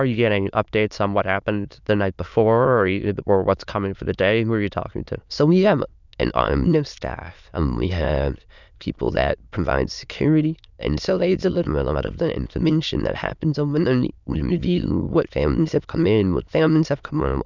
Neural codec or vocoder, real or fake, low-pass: autoencoder, 22.05 kHz, a latent of 192 numbers a frame, VITS, trained on many speakers; fake; 7.2 kHz